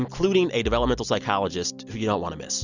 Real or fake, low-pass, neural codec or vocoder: real; 7.2 kHz; none